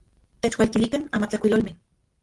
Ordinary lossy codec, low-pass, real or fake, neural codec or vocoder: Opus, 24 kbps; 10.8 kHz; real; none